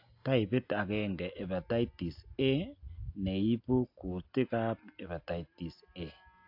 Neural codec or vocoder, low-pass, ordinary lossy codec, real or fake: none; 5.4 kHz; AAC, 48 kbps; real